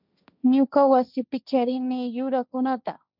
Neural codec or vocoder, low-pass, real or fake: codec, 16 kHz, 1.1 kbps, Voila-Tokenizer; 5.4 kHz; fake